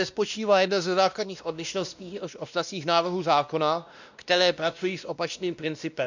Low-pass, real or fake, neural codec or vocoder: 7.2 kHz; fake; codec, 16 kHz, 1 kbps, X-Codec, WavLM features, trained on Multilingual LibriSpeech